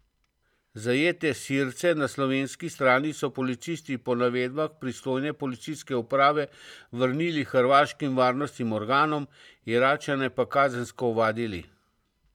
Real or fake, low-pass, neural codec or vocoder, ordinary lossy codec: fake; 19.8 kHz; vocoder, 44.1 kHz, 128 mel bands every 512 samples, BigVGAN v2; none